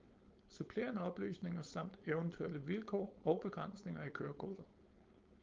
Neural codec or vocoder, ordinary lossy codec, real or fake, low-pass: codec, 16 kHz, 4.8 kbps, FACodec; Opus, 32 kbps; fake; 7.2 kHz